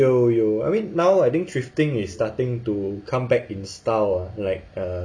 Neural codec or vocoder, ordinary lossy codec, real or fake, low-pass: none; none; real; 9.9 kHz